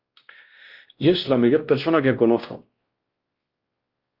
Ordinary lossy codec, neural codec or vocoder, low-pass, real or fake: Opus, 24 kbps; codec, 16 kHz, 1 kbps, X-Codec, WavLM features, trained on Multilingual LibriSpeech; 5.4 kHz; fake